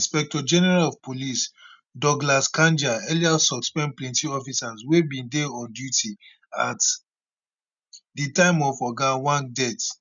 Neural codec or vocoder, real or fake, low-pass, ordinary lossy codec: none; real; 7.2 kHz; none